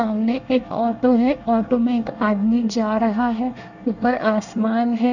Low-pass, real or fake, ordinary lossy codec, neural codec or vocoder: 7.2 kHz; fake; none; codec, 24 kHz, 1 kbps, SNAC